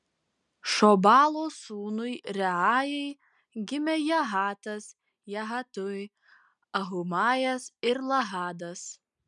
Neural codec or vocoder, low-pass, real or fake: none; 10.8 kHz; real